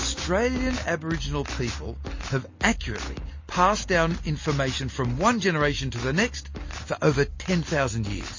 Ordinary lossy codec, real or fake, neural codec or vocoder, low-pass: MP3, 32 kbps; real; none; 7.2 kHz